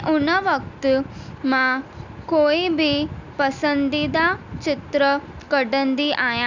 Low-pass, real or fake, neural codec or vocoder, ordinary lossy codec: 7.2 kHz; real; none; none